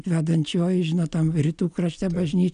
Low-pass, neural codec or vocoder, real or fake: 9.9 kHz; none; real